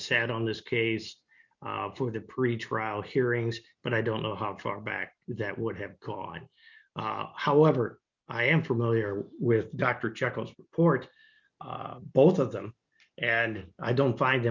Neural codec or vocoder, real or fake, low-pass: none; real; 7.2 kHz